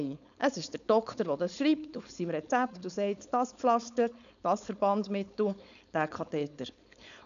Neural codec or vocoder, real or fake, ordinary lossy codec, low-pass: codec, 16 kHz, 4.8 kbps, FACodec; fake; none; 7.2 kHz